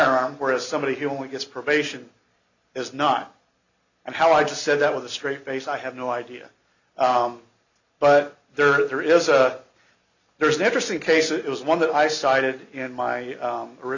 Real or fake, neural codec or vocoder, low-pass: real; none; 7.2 kHz